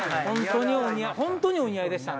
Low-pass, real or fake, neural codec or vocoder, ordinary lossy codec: none; real; none; none